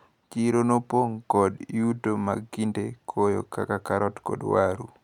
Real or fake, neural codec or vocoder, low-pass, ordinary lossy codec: real; none; 19.8 kHz; none